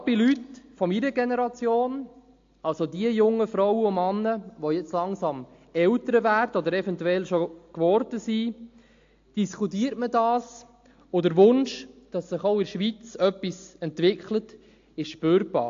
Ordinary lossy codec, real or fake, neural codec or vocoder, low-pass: AAC, 48 kbps; real; none; 7.2 kHz